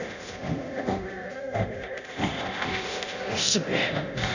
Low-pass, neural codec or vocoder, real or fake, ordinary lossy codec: 7.2 kHz; codec, 24 kHz, 0.9 kbps, DualCodec; fake; none